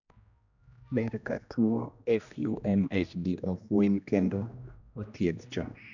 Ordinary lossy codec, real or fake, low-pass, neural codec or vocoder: none; fake; 7.2 kHz; codec, 16 kHz, 1 kbps, X-Codec, HuBERT features, trained on general audio